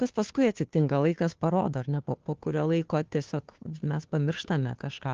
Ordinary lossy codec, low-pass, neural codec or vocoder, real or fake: Opus, 16 kbps; 7.2 kHz; codec, 16 kHz, 2 kbps, FunCodec, trained on Chinese and English, 25 frames a second; fake